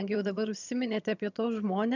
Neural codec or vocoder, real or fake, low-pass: vocoder, 22.05 kHz, 80 mel bands, HiFi-GAN; fake; 7.2 kHz